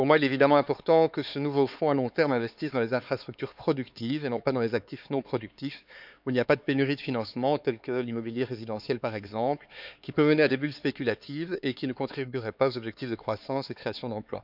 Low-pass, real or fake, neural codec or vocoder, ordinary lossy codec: 5.4 kHz; fake; codec, 16 kHz, 4 kbps, X-Codec, HuBERT features, trained on LibriSpeech; none